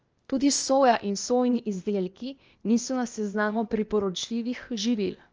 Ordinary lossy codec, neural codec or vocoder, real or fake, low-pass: Opus, 24 kbps; codec, 16 kHz, 0.8 kbps, ZipCodec; fake; 7.2 kHz